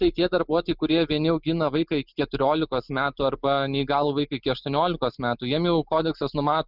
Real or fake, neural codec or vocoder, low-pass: real; none; 5.4 kHz